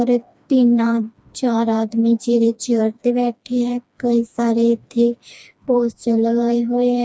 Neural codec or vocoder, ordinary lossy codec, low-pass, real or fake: codec, 16 kHz, 2 kbps, FreqCodec, smaller model; none; none; fake